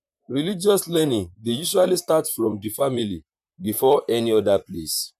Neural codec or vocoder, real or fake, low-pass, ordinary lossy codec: vocoder, 44.1 kHz, 128 mel bands, Pupu-Vocoder; fake; 14.4 kHz; none